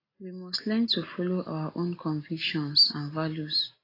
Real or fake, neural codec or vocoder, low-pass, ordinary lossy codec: real; none; 5.4 kHz; AAC, 24 kbps